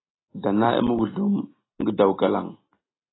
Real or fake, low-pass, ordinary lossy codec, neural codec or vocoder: real; 7.2 kHz; AAC, 16 kbps; none